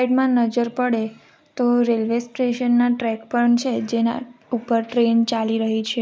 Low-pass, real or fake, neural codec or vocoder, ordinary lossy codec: none; real; none; none